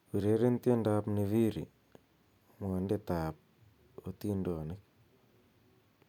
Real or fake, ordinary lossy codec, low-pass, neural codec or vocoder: real; none; 19.8 kHz; none